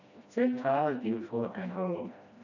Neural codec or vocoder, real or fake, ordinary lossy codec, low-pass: codec, 16 kHz, 1 kbps, FreqCodec, smaller model; fake; none; 7.2 kHz